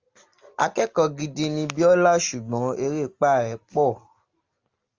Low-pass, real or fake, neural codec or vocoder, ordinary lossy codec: 7.2 kHz; real; none; Opus, 32 kbps